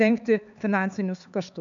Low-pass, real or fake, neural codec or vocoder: 7.2 kHz; fake; codec, 16 kHz, 4 kbps, X-Codec, HuBERT features, trained on LibriSpeech